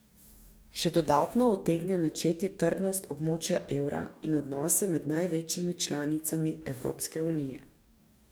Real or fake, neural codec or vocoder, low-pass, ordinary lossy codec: fake; codec, 44.1 kHz, 2.6 kbps, DAC; none; none